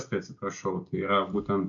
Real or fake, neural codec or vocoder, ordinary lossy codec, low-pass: real; none; AAC, 64 kbps; 7.2 kHz